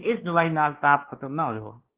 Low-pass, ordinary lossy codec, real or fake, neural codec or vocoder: 3.6 kHz; Opus, 16 kbps; fake; codec, 16 kHz, 2 kbps, X-Codec, WavLM features, trained on Multilingual LibriSpeech